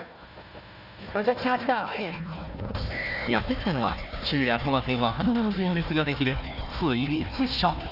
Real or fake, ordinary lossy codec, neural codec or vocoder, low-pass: fake; none; codec, 16 kHz, 1 kbps, FunCodec, trained on Chinese and English, 50 frames a second; 5.4 kHz